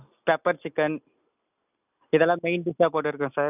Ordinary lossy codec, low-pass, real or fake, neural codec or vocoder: none; 3.6 kHz; real; none